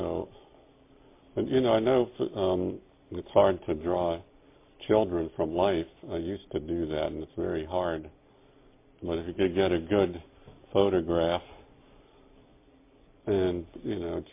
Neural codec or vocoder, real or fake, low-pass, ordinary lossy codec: none; real; 3.6 kHz; MP3, 24 kbps